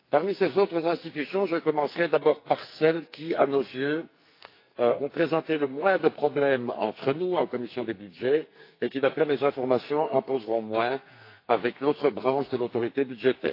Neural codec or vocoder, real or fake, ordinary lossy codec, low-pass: codec, 44.1 kHz, 2.6 kbps, SNAC; fake; AAC, 32 kbps; 5.4 kHz